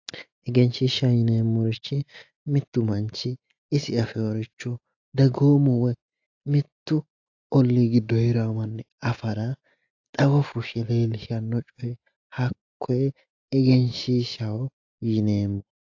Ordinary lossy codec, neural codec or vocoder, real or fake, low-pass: AAC, 48 kbps; none; real; 7.2 kHz